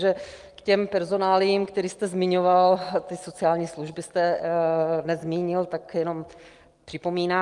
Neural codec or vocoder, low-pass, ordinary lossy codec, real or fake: none; 10.8 kHz; Opus, 32 kbps; real